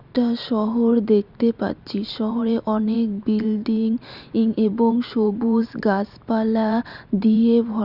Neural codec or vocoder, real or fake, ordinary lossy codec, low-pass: vocoder, 44.1 kHz, 128 mel bands every 512 samples, BigVGAN v2; fake; Opus, 64 kbps; 5.4 kHz